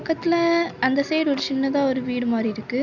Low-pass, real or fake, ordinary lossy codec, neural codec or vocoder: 7.2 kHz; real; none; none